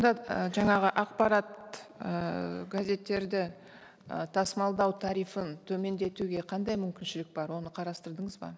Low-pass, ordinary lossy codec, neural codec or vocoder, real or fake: none; none; none; real